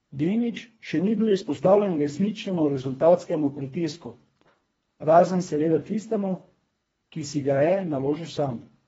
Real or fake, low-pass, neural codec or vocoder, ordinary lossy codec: fake; 10.8 kHz; codec, 24 kHz, 1.5 kbps, HILCodec; AAC, 24 kbps